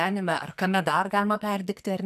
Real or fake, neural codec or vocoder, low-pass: fake; codec, 44.1 kHz, 2.6 kbps, SNAC; 14.4 kHz